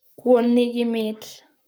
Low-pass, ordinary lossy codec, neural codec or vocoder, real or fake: none; none; codec, 44.1 kHz, 7.8 kbps, Pupu-Codec; fake